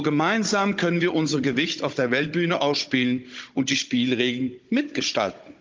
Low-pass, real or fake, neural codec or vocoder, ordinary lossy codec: 7.2 kHz; fake; codec, 16 kHz, 16 kbps, FunCodec, trained on Chinese and English, 50 frames a second; Opus, 24 kbps